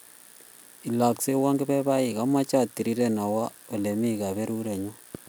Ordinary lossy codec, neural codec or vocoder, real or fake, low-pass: none; none; real; none